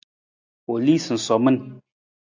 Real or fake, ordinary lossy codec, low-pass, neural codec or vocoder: real; AAC, 48 kbps; 7.2 kHz; none